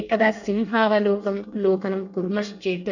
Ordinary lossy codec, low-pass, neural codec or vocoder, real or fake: none; 7.2 kHz; codec, 24 kHz, 1 kbps, SNAC; fake